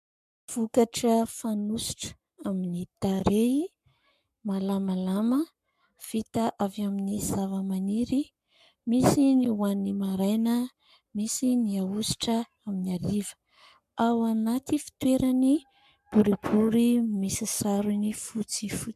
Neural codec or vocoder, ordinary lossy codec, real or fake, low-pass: codec, 44.1 kHz, 7.8 kbps, Pupu-Codec; MP3, 96 kbps; fake; 14.4 kHz